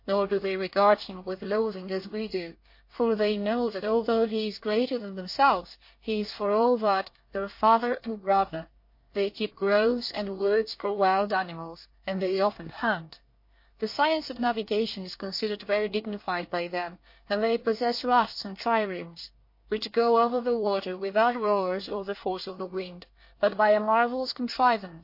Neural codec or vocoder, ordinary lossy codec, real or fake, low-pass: codec, 24 kHz, 1 kbps, SNAC; MP3, 32 kbps; fake; 5.4 kHz